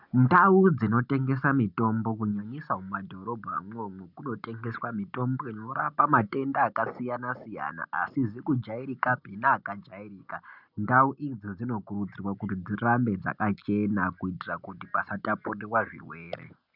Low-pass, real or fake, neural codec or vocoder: 5.4 kHz; real; none